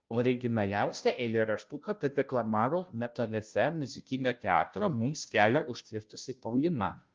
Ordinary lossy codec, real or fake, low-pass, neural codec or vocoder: Opus, 24 kbps; fake; 7.2 kHz; codec, 16 kHz, 0.5 kbps, FunCodec, trained on Chinese and English, 25 frames a second